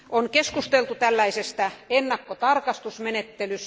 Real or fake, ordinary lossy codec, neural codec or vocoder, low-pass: real; none; none; none